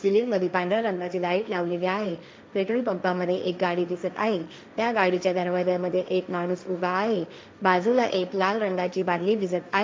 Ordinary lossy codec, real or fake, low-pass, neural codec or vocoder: none; fake; none; codec, 16 kHz, 1.1 kbps, Voila-Tokenizer